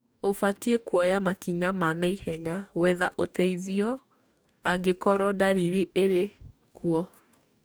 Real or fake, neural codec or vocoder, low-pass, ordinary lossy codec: fake; codec, 44.1 kHz, 2.6 kbps, DAC; none; none